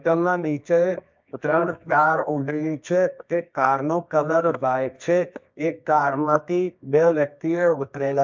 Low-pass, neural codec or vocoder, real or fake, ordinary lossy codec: 7.2 kHz; codec, 24 kHz, 0.9 kbps, WavTokenizer, medium music audio release; fake; MP3, 64 kbps